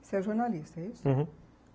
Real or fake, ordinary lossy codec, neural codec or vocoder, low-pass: real; none; none; none